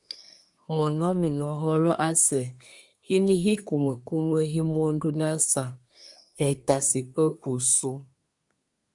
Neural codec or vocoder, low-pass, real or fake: codec, 24 kHz, 1 kbps, SNAC; 10.8 kHz; fake